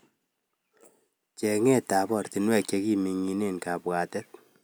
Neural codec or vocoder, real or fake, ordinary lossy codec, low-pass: none; real; none; none